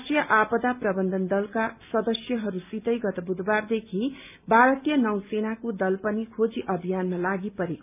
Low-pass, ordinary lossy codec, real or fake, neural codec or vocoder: 3.6 kHz; none; real; none